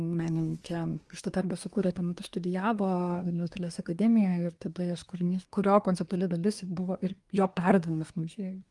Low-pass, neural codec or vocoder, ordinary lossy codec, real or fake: 10.8 kHz; codec, 24 kHz, 1 kbps, SNAC; Opus, 32 kbps; fake